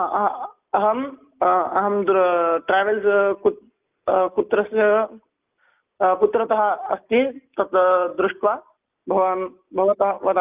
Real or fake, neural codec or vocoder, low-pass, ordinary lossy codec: real; none; 3.6 kHz; Opus, 24 kbps